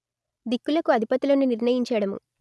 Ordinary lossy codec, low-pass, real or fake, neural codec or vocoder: none; none; real; none